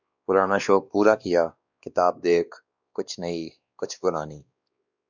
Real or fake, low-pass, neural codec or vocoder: fake; 7.2 kHz; codec, 16 kHz, 2 kbps, X-Codec, WavLM features, trained on Multilingual LibriSpeech